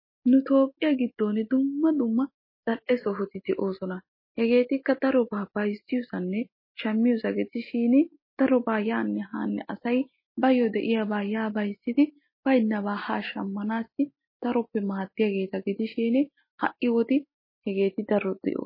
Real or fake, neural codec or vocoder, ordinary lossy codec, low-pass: real; none; MP3, 24 kbps; 5.4 kHz